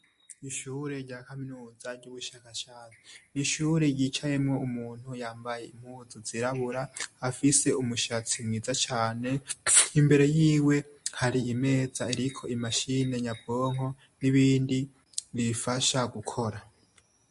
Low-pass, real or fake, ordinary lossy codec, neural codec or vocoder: 14.4 kHz; real; MP3, 48 kbps; none